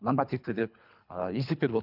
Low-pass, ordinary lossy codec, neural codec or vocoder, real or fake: 5.4 kHz; none; codec, 24 kHz, 3 kbps, HILCodec; fake